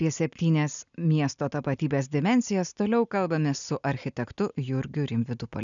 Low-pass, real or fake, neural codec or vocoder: 7.2 kHz; real; none